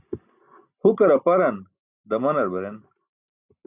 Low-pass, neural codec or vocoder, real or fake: 3.6 kHz; none; real